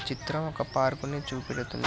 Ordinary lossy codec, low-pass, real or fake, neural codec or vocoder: none; none; real; none